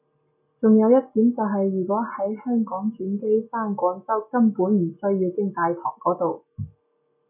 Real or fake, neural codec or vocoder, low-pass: real; none; 3.6 kHz